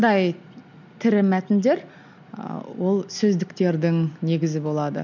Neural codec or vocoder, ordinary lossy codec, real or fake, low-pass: none; none; real; 7.2 kHz